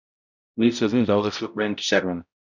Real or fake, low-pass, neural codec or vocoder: fake; 7.2 kHz; codec, 16 kHz, 0.5 kbps, X-Codec, HuBERT features, trained on balanced general audio